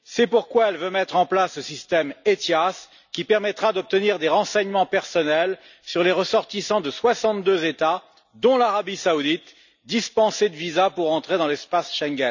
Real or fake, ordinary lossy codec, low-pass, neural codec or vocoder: real; none; 7.2 kHz; none